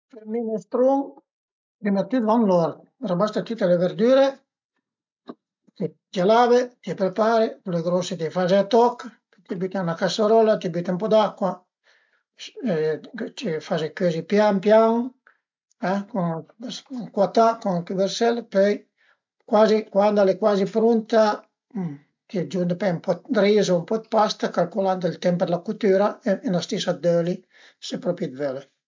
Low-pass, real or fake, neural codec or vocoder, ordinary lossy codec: 7.2 kHz; real; none; MP3, 64 kbps